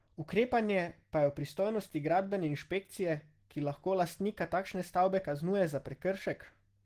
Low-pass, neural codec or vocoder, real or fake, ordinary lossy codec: 14.4 kHz; none; real; Opus, 16 kbps